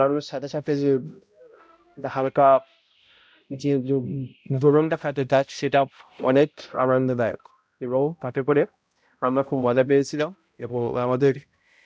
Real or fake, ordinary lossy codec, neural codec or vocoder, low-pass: fake; none; codec, 16 kHz, 0.5 kbps, X-Codec, HuBERT features, trained on balanced general audio; none